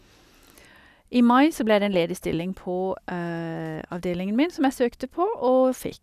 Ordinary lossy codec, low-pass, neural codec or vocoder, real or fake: none; 14.4 kHz; none; real